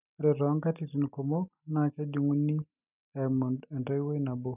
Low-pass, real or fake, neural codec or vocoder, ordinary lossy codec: 3.6 kHz; real; none; none